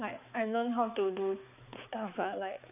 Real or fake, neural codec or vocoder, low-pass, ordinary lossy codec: fake; codec, 16 kHz, 4 kbps, X-Codec, HuBERT features, trained on balanced general audio; 3.6 kHz; none